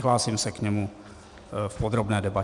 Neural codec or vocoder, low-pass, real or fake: none; 10.8 kHz; real